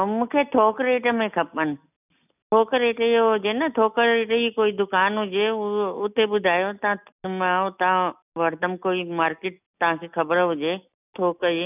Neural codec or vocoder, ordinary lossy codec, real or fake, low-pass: none; none; real; 3.6 kHz